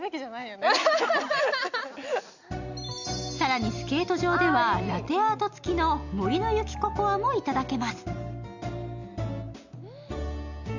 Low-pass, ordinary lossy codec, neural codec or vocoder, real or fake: 7.2 kHz; none; none; real